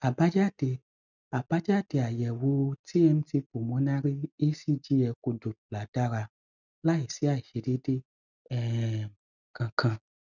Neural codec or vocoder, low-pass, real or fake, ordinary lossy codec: none; 7.2 kHz; real; none